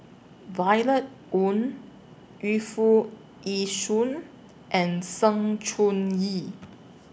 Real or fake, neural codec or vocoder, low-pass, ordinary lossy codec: real; none; none; none